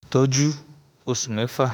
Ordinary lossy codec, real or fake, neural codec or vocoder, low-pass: none; fake; autoencoder, 48 kHz, 32 numbers a frame, DAC-VAE, trained on Japanese speech; none